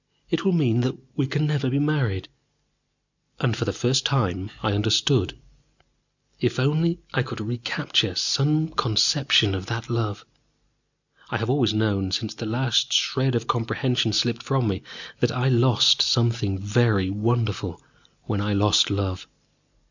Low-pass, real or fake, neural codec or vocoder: 7.2 kHz; real; none